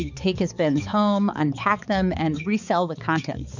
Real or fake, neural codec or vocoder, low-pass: fake; codec, 16 kHz, 4 kbps, X-Codec, HuBERT features, trained on balanced general audio; 7.2 kHz